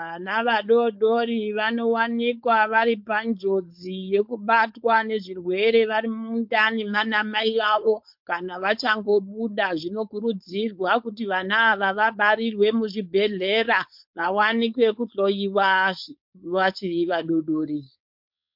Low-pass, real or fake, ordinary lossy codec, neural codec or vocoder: 5.4 kHz; fake; MP3, 48 kbps; codec, 16 kHz, 4.8 kbps, FACodec